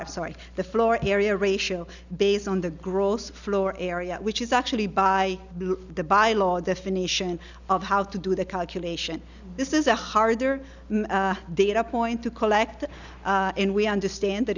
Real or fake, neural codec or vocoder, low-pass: real; none; 7.2 kHz